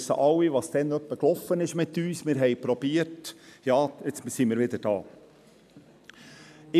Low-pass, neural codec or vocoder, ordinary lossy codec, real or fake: 14.4 kHz; none; none; real